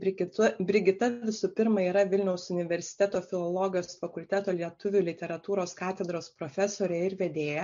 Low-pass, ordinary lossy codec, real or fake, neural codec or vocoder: 7.2 kHz; MP3, 64 kbps; real; none